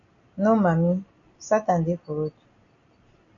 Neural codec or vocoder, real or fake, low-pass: none; real; 7.2 kHz